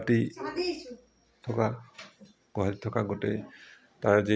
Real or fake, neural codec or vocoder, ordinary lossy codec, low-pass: real; none; none; none